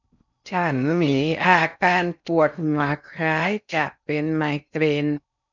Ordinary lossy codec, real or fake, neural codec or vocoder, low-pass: none; fake; codec, 16 kHz in and 24 kHz out, 0.6 kbps, FocalCodec, streaming, 4096 codes; 7.2 kHz